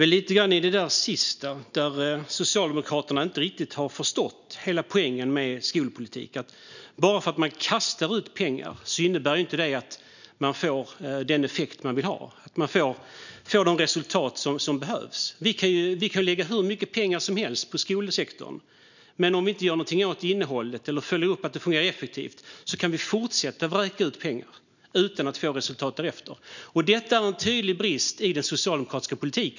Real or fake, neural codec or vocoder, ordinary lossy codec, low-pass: real; none; none; 7.2 kHz